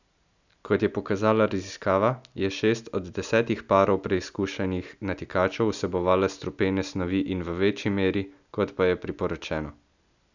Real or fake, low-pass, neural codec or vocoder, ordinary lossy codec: real; 7.2 kHz; none; none